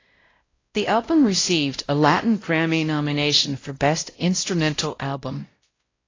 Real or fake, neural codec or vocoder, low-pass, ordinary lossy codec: fake; codec, 16 kHz, 0.5 kbps, X-Codec, WavLM features, trained on Multilingual LibriSpeech; 7.2 kHz; AAC, 32 kbps